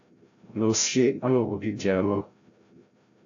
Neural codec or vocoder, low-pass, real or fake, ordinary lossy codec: codec, 16 kHz, 0.5 kbps, FreqCodec, larger model; 7.2 kHz; fake; AAC, 48 kbps